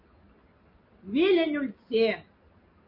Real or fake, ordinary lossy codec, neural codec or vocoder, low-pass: real; MP3, 32 kbps; none; 5.4 kHz